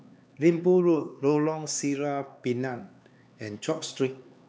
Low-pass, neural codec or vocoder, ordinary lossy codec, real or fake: none; codec, 16 kHz, 4 kbps, X-Codec, HuBERT features, trained on LibriSpeech; none; fake